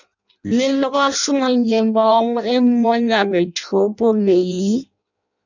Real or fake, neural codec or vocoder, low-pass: fake; codec, 16 kHz in and 24 kHz out, 0.6 kbps, FireRedTTS-2 codec; 7.2 kHz